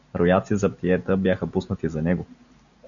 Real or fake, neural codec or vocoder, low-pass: real; none; 7.2 kHz